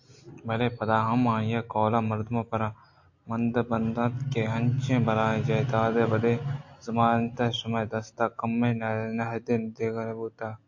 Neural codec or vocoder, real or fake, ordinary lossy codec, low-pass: none; real; AAC, 48 kbps; 7.2 kHz